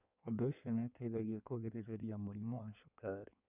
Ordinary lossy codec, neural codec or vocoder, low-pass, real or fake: none; codec, 16 kHz in and 24 kHz out, 1.1 kbps, FireRedTTS-2 codec; 3.6 kHz; fake